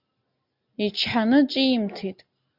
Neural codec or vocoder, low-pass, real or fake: none; 5.4 kHz; real